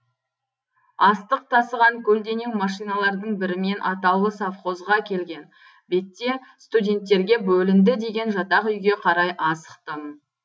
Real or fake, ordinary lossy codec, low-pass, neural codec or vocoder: real; none; none; none